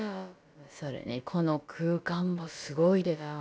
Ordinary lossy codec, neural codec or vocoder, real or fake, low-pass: none; codec, 16 kHz, about 1 kbps, DyCAST, with the encoder's durations; fake; none